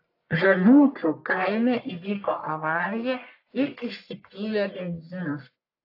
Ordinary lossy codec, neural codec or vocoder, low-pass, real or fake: AAC, 32 kbps; codec, 44.1 kHz, 1.7 kbps, Pupu-Codec; 5.4 kHz; fake